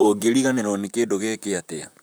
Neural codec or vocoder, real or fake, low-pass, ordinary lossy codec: vocoder, 44.1 kHz, 128 mel bands, Pupu-Vocoder; fake; none; none